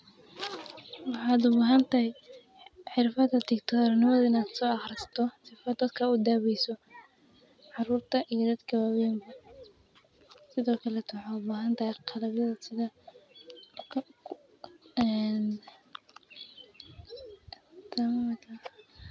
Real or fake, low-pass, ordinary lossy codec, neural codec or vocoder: real; none; none; none